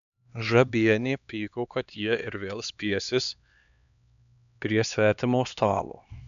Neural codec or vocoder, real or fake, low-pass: codec, 16 kHz, 2 kbps, X-Codec, HuBERT features, trained on LibriSpeech; fake; 7.2 kHz